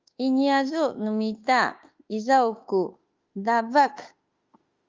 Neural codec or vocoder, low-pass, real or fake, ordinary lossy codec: autoencoder, 48 kHz, 32 numbers a frame, DAC-VAE, trained on Japanese speech; 7.2 kHz; fake; Opus, 32 kbps